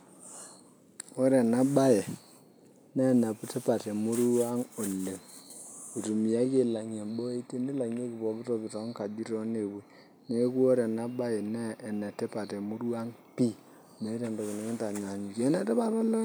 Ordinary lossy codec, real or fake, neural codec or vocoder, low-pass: none; real; none; none